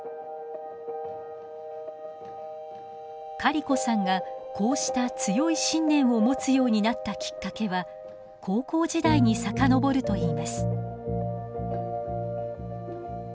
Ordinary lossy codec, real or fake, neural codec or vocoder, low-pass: none; real; none; none